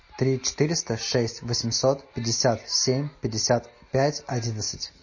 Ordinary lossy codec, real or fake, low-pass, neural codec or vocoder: MP3, 32 kbps; real; 7.2 kHz; none